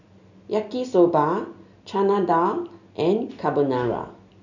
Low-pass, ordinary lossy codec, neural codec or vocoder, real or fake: 7.2 kHz; none; none; real